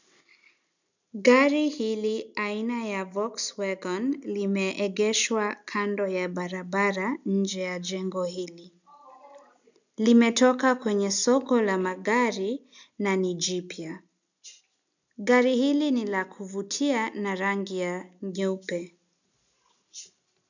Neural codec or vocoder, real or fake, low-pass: none; real; 7.2 kHz